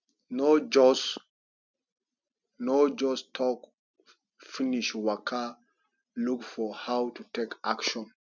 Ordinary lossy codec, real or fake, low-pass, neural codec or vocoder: none; real; 7.2 kHz; none